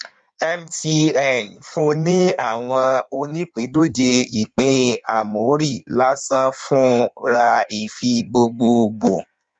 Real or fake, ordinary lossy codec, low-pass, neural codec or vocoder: fake; none; 9.9 kHz; codec, 16 kHz in and 24 kHz out, 1.1 kbps, FireRedTTS-2 codec